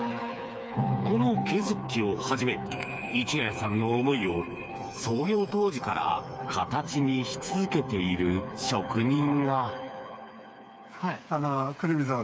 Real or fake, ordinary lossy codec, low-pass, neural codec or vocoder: fake; none; none; codec, 16 kHz, 4 kbps, FreqCodec, smaller model